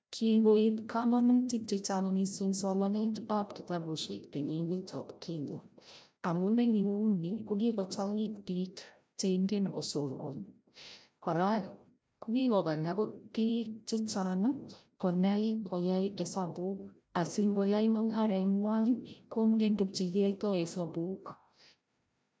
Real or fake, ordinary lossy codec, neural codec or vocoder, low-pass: fake; none; codec, 16 kHz, 0.5 kbps, FreqCodec, larger model; none